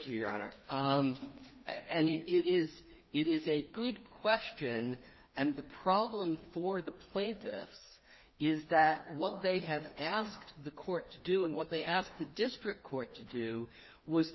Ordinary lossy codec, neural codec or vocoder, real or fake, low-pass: MP3, 24 kbps; codec, 16 kHz, 2 kbps, FreqCodec, larger model; fake; 7.2 kHz